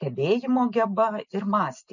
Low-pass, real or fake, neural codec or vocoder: 7.2 kHz; real; none